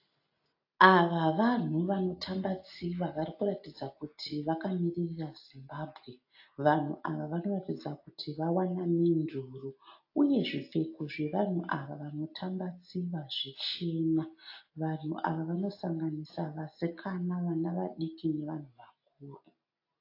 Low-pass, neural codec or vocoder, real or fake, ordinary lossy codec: 5.4 kHz; none; real; AAC, 32 kbps